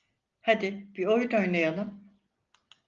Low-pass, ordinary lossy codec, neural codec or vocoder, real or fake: 7.2 kHz; Opus, 32 kbps; none; real